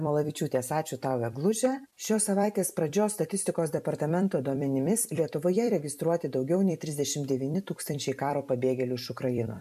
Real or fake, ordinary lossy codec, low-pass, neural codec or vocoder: fake; MP3, 96 kbps; 14.4 kHz; vocoder, 44.1 kHz, 128 mel bands every 256 samples, BigVGAN v2